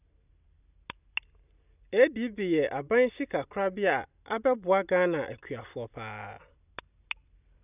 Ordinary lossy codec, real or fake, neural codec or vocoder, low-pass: none; real; none; 3.6 kHz